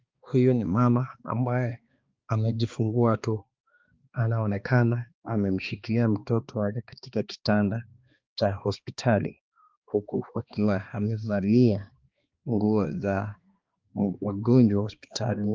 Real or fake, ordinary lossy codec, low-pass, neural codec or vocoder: fake; Opus, 24 kbps; 7.2 kHz; codec, 16 kHz, 2 kbps, X-Codec, HuBERT features, trained on balanced general audio